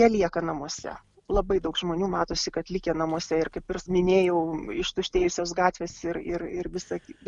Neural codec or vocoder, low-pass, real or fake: none; 10.8 kHz; real